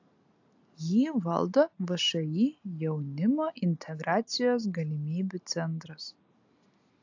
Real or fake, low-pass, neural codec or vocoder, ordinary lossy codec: real; 7.2 kHz; none; AAC, 48 kbps